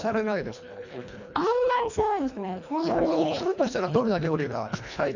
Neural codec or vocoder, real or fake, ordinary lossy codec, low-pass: codec, 24 kHz, 1.5 kbps, HILCodec; fake; none; 7.2 kHz